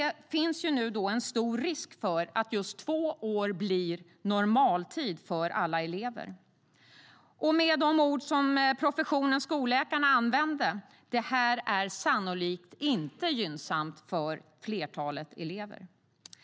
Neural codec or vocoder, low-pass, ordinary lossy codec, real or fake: none; none; none; real